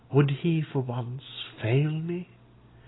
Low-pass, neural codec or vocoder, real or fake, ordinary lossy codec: 7.2 kHz; none; real; AAC, 16 kbps